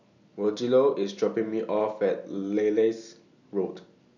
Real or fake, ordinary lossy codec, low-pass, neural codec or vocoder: real; none; 7.2 kHz; none